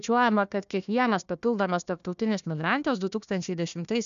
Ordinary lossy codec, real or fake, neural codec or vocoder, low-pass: MP3, 96 kbps; fake; codec, 16 kHz, 1 kbps, FunCodec, trained on Chinese and English, 50 frames a second; 7.2 kHz